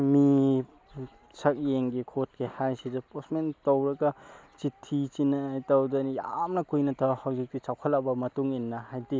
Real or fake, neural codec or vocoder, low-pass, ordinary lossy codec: real; none; none; none